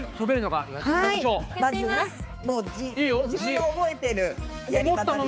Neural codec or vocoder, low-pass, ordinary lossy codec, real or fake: codec, 16 kHz, 4 kbps, X-Codec, HuBERT features, trained on balanced general audio; none; none; fake